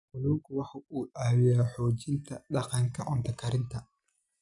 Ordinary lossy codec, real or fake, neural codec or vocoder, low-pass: none; real; none; 10.8 kHz